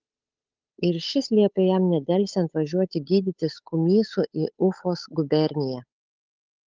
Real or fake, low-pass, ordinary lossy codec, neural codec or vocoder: fake; 7.2 kHz; Opus, 24 kbps; codec, 16 kHz, 8 kbps, FunCodec, trained on Chinese and English, 25 frames a second